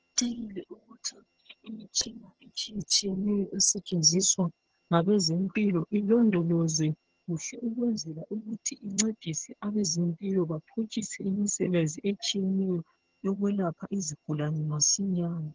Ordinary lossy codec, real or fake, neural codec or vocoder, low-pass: Opus, 16 kbps; fake; vocoder, 22.05 kHz, 80 mel bands, HiFi-GAN; 7.2 kHz